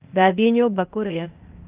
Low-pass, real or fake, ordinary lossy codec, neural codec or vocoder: 3.6 kHz; fake; Opus, 32 kbps; codec, 16 kHz, 0.8 kbps, ZipCodec